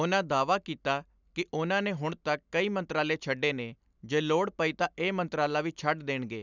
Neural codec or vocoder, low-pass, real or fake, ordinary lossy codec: none; 7.2 kHz; real; none